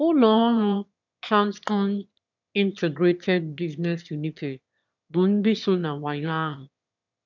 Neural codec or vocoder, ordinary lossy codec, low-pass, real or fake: autoencoder, 22.05 kHz, a latent of 192 numbers a frame, VITS, trained on one speaker; none; 7.2 kHz; fake